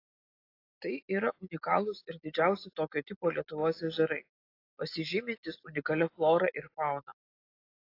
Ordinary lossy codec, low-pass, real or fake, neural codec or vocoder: AAC, 32 kbps; 5.4 kHz; real; none